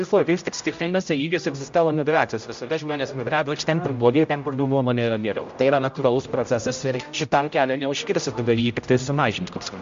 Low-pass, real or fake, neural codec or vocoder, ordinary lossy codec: 7.2 kHz; fake; codec, 16 kHz, 0.5 kbps, X-Codec, HuBERT features, trained on general audio; MP3, 48 kbps